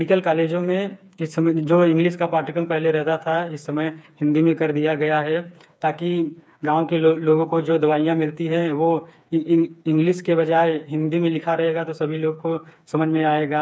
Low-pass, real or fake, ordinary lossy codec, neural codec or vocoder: none; fake; none; codec, 16 kHz, 4 kbps, FreqCodec, smaller model